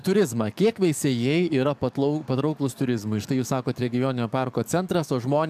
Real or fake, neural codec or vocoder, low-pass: fake; codec, 44.1 kHz, 7.8 kbps, DAC; 14.4 kHz